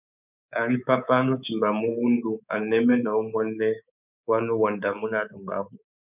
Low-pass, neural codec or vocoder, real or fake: 3.6 kHz; codec, 24 kHz, 3.1 kbps, DualCodec; fake